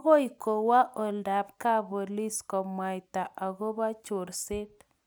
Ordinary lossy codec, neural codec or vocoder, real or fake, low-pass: none; none; real; none